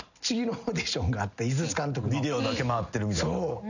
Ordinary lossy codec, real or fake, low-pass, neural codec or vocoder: none; real; 7.2 kHz; none